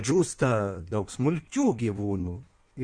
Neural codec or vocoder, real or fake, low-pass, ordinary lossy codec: codec, 16 kHz in and 24 kHz out, 1.1 kbps, FireRedTTS-2 codec; fake; 9.9 kHz; Opus, 64 kbps